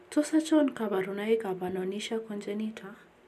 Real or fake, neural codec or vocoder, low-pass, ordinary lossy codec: real; none; 14.4 kHz; none